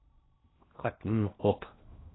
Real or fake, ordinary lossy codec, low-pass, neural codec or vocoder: fake; AAC, 16 kbps; 7.2 kHz; codec, 16 kHz in and 24 kHz out, 0.6 kbps, FocalCodec, streaming, 2048 codes